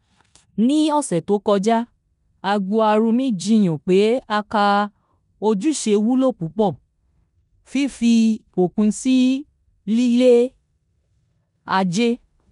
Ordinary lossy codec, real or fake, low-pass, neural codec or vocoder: none; fake; 10.8 kHz; codec, 16 kHz in and 24 kHz out, 0.9 kbps, LongCat-Audio-Codec, four codebook decoder